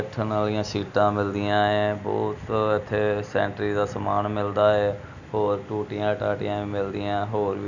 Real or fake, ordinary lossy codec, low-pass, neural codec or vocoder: real; none; 7.2 kHz; none